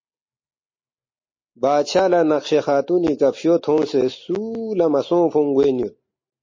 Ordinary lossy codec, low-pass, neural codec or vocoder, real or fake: MP3, 32 kbps; 7.2 kHz; none; real